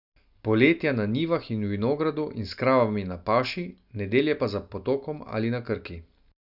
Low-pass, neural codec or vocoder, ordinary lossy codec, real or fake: 5.4 kHz; none; none; real